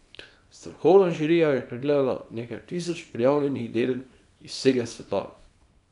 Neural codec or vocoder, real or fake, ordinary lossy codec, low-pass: codec, 24 kHz, 0.9 kbps, WavTokenizer, small release; fake; none; 10.8 kHz